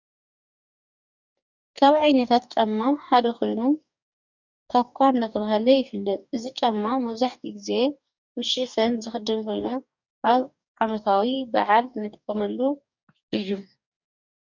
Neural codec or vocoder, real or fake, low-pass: codec, 44.1 kHz, 2.6 kbps, DAC; fake; 7.2 kHz